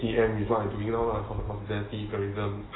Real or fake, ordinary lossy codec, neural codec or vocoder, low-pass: real; AAC, 16 kbps; none; 7.2 kHz